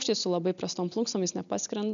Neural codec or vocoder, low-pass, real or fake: none; 7.2 kHz; real